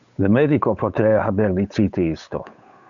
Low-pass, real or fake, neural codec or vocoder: 7.2 kHz; fake; codec, 16 kHz, 8 kbps, FunCodec, trained on Chinese and English, 25 frames a second